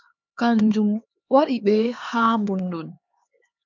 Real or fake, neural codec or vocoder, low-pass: fake; codec, 16 kHz, 4 kbps, X-Codec, HuBERT features, trained on LibriSpeech; 7.2 kHz